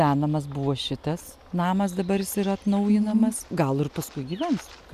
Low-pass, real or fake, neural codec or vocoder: 14.4 kHz; real; none